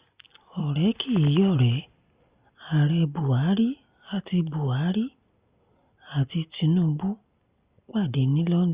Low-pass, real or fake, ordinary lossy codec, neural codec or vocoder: 3.6 kHz; real; Opus, 64 kbps; none